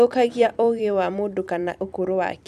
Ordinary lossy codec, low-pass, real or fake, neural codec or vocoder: none; 14.4 kHz; fake; vocoder, 44.1 kHz, 128 mel bands every 256 samples, BigVGAN v2